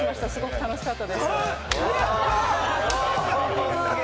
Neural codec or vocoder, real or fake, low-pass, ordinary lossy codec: none; real; none; none